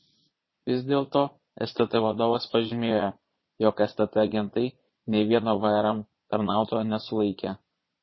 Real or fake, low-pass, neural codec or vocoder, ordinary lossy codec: fake; 7.2 kHz; vocoder, 22.05 kHz, 80 mel bands, Vocos; MP3, 24 kbps